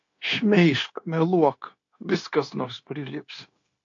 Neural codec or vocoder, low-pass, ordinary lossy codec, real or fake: codec, 16 kHz, 0.9 kbps, LongCat-Audio-Codec; 7.2 kHz; AAC, 48 kbps; fake